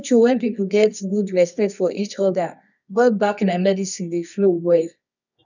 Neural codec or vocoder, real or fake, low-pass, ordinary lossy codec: codec, 24 kHz, 0.9 kbps, WavTokenizer, medium music audio release; fake; 7.2 kHz; none